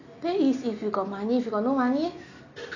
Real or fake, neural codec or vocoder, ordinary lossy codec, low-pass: real; none; none; 7.2 kHz